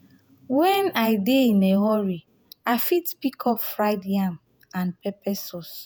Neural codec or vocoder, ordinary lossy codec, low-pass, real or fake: vocoder, 48 kHz, 128 mel bands, Vocos; none; none; fake